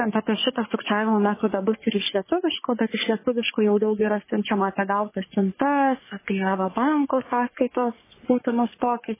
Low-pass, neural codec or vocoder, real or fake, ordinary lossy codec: 3.6 kHz; codec, 44.1 kHz, 3.4 kbps, Pupu-Codec; fake; MP3, 16 kbps